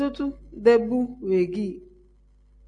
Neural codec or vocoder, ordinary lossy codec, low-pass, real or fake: none; MP3, 48 kbps; 9.9 kHz; real